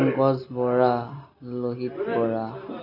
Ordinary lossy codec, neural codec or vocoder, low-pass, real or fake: none; none; 5.4 kHz; real